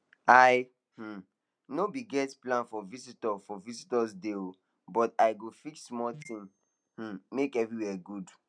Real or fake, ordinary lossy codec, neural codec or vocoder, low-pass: real; none; none; 9.9 kHz